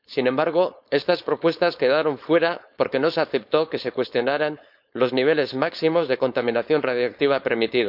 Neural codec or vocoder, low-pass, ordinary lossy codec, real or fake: codec, 16 kHz, 4.8 kbps, FACodec; 5.4 kHz; none; fake